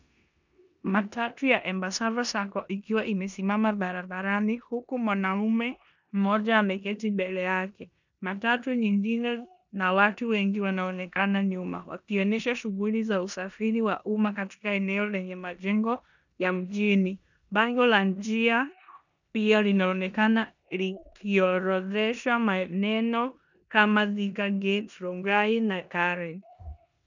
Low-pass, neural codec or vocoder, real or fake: 7.2 kHz; codec, 16 kHz in and 24 kHz out, 0.9 kbps, LongCat-Audio-Codec, four codebook decoder; fake